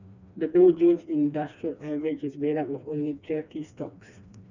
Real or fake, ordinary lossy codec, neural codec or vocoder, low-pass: fake; none; codec, 16 kHz, 2 kbps, FreqCodec, smaller model; 7.2 kHz